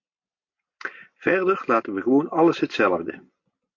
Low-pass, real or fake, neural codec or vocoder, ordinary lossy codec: 7.2 kHz; real; none; MP3, 48 kbps